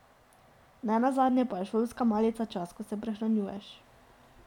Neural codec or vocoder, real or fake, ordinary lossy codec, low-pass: none; real; none; 19.8 kHz